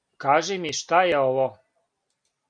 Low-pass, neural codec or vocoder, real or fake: 9.9 kHz; none; real